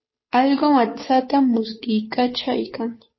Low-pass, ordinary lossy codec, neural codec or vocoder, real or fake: 7.2 kHz; MP3, 24 kbps; codec, 16 kHz, 2 kbps, FunCodec, trained on Chinese and English, 25 frames a second; fake